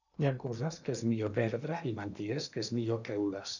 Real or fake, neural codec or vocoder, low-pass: fake; codec, 16 kHz in and 24 kHz out, 0.8 kbps, FocalCodec, streaming, 65536 codes; 7.2 kHz